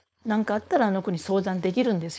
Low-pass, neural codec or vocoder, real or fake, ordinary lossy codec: none; codec, 16 kHz, 4.8 kbps, FACodec; fake; none